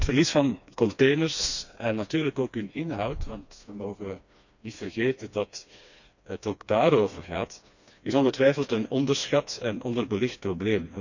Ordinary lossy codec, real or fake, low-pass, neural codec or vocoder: none; fake; 7.2 kHz; codec, 16 kHz, 2 kbps, FreqCodec, smaller model